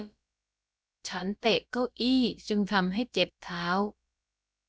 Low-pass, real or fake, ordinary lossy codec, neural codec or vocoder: none; fake; none; codec, 16 kHz, about 1 kbps, DyCAST, with the encoder's durations